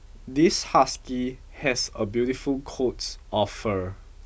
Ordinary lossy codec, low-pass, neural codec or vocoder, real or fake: none; none; none; real